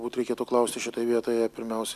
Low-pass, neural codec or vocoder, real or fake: 14.4 kHz; none; real